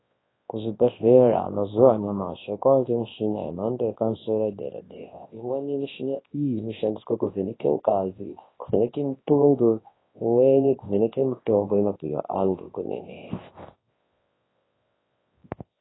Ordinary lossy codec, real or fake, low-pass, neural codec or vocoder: AAC, 16 kbps; fake; 7.2 kHz; codec, 24 kHz, 0.9 kbps, WavTokenizer, large speech release